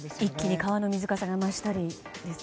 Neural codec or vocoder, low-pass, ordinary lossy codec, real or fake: none; none; none; real